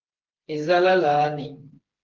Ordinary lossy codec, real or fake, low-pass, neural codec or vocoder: Opus, 16 kbps; fake; 7.2 kHz; codec, 16 kHz, 4 kbps, FreqCodec, smaller model